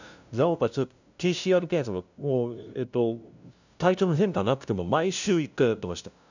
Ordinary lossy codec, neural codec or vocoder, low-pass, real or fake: none; codec, 16 kHz, 0.5 kbps, FunCodec, trained on LibriTTS, 25 frames a second; 7.2 kHz; fake